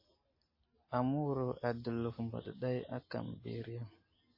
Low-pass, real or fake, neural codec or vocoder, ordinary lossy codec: 5.4 kHz; real; none; MP3, 24 kbps